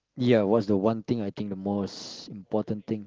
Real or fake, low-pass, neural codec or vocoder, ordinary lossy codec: real; 7.2 kHz; none; Opus, 16 kbps